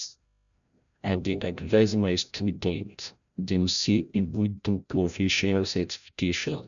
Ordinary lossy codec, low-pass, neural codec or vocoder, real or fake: none; 7.2 kHz; codec, 16 kHz, 0.5 kbps, FreqCodec, larger model; fake